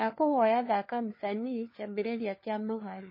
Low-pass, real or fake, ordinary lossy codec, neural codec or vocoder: 5.4 kHz; fake; MP3, 24 kbps; codec, 16 kHz, 4 kbps, FunCodec, trained on LibriTTS, 50 frames a second